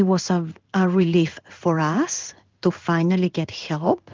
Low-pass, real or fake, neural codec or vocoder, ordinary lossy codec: 7.2 kHz; fake; codec, 16 kHz in and 24 kHz out, 1 kbps, XY-Tokenizer; Opus, 32 kbps